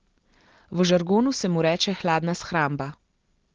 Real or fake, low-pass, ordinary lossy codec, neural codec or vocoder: real; 7.2 kHz; Opus, 16 kbps; none